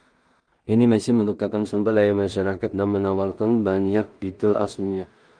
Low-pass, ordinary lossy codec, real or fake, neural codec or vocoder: 9.9 kHz; Opus, 32 kbps; fake; codec, 16 kHz in and 24 kHz out, 0.4 kbps, LongCat-Audio-Codec, two codebook decoder